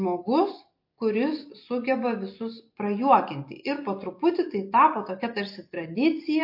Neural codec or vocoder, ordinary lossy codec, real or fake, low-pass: none; MP3, 32 kbps; real; 5.4 kHz